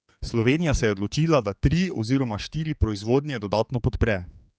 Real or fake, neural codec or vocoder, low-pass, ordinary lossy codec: fake; codec, 16 kHz, 4 kbps, X-Codec, HuBERT features, trained on general audio; none; none